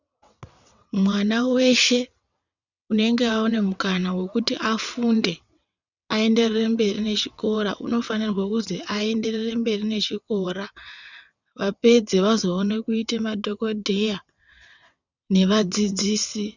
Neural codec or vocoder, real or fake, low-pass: vocoder, 22.05 kHz, 80 mel bands, WaveNeXt; fake; 7.2 kHz